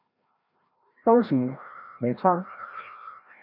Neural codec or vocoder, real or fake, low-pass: codec, 16 kHz, 1 kbps, FreqCodec, larger model; fake; 5.4 kHz